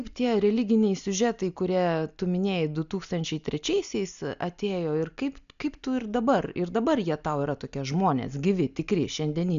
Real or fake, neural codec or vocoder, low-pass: real; none; 7.2 kHz